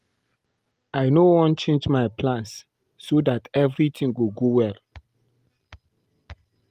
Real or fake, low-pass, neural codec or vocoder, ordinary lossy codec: real; 14.4 kHz; none; Opus, 24 kbps